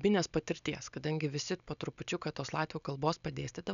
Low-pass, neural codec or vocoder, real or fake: 7.2 kHz; none; real